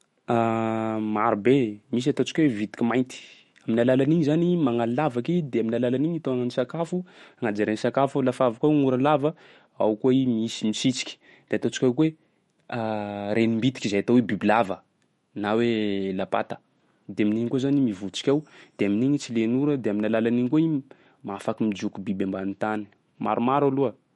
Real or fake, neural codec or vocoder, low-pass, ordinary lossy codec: fake; autoencoder, 48 kHz, 128 numbers a frame, DAC-VAE, trained on Japanese speech; 19.8 kHz; MP3, 48 kbps